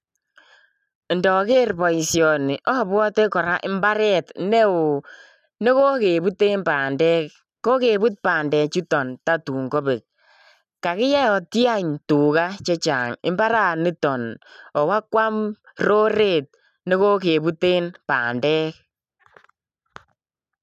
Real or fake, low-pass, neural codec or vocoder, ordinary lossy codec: real; 14.4 kHz; none; none